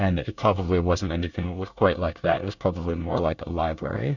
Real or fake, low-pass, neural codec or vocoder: fake; 7.2 kHz; codec, 24 kHz, 1 kbps, SNAC